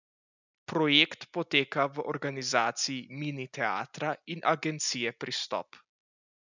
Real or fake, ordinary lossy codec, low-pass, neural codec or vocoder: real; none; 7.2 kHz; none